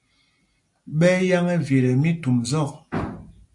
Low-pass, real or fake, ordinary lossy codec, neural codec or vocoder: 10.8 kHz; real; AAC, 64 kbps; none